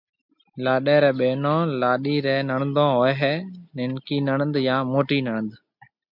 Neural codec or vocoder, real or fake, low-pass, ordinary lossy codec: none; real; 5.4 kHz; MP3, 48 kbps